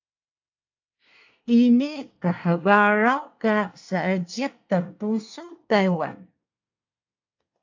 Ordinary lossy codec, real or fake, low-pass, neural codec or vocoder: AAC, 48 kbps; fake; 7.2 kHz; codec, 24 kHz, 1 kbps, SNAC